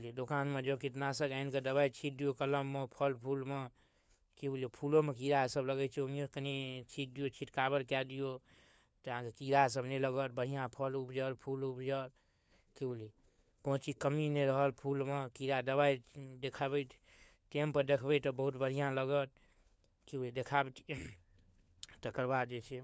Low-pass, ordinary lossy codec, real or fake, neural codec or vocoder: none; none; fake; codec, 16 kHz, 4 kbps, FunCodec, trained on LibriTTS, 50 frames a second